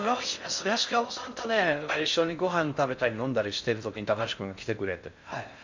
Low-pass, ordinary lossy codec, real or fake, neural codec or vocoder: 7.2 kHz; MP3, 64 kbps; fake; codec, 16 kHz in and 24 kHz out, 0.6 kbps, FocalCodec, streaming, 4096 codes